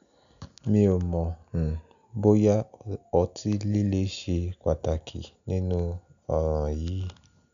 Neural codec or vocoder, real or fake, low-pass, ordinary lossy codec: none; real; 7.2 kHz; none